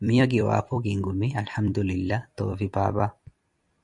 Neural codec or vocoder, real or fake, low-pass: vocoder, 44.1 kHz, 128 mel bands every 256 samples, BigVGAN v2; fake; 10.8 kHz